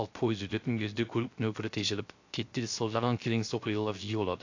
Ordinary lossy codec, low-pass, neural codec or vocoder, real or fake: AAC, 48 kbps; 7.2 kHz; codec, 16 kHz, 0.3 kbps, FocalCodec; fake